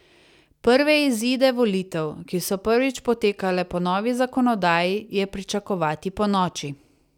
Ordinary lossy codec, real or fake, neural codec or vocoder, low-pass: none; real; none; 19.8 kHz